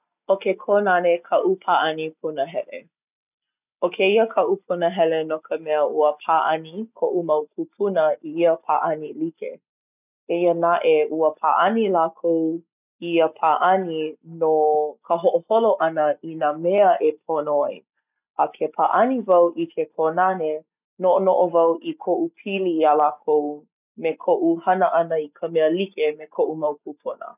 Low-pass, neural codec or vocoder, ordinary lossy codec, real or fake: 3.6 kHz; none; none; real